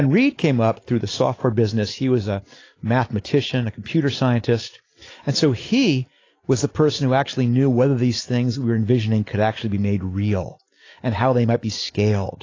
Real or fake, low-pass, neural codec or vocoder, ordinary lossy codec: real; 7.2 kHz; none; AAC, 32 kbps